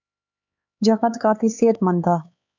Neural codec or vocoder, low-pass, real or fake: codec, 16 kHz, 4 kbps, X-Codec, HuBERT features, trained on LibriSpeech; 7.2 kHz; fake